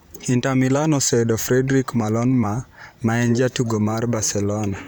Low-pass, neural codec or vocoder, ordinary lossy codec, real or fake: none; vocoder, 44.1 kHz, 128 mel bands, Pupu-Vocoder; none; fake